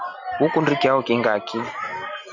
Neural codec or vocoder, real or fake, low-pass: none; real; 7.2 kHz